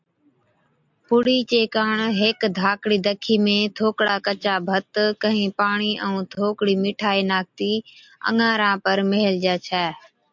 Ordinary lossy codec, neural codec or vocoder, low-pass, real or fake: MP3, 64 kbps; none; 7.2 kHz; real